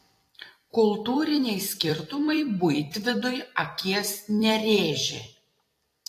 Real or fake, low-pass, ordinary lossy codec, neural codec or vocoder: fake; 14.4 kHz; AAC, 48 kbps; vocoder, 44.1 kHz, 128 mel bands every 256 samples, BigVGAN v2